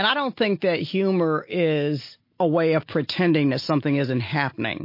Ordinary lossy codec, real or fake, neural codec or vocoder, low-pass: MP3, 32 kbps; real; none; 5.4 kHz